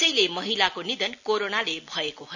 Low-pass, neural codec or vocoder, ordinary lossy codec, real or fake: 7.2 kHz; none; none; real